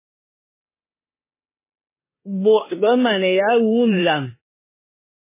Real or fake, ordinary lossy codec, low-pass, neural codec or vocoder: fake; MP3, 16 kbps; 3.6 kHz; codec, 16 kHz in and 24 kHz out, 0.9 kbps, LongCat-Audio-Codec, four codebook decoder